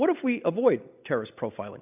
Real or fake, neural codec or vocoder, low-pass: real; none; 3.6 kHz